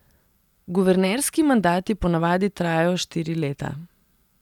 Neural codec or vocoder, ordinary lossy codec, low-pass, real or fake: none; none; 19.8 kHz; real